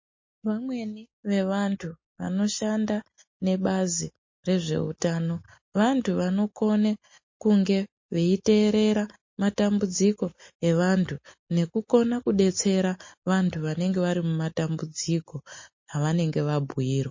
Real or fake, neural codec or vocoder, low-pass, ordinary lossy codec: real; none; 7.2 kHz; MP3, 32 kbps